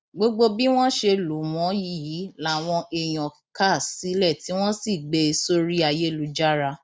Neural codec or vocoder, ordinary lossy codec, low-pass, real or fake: none; none; none; real